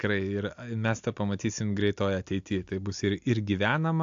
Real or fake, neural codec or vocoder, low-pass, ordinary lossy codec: real; none; 7.2 kHz; AAC, 64 kbps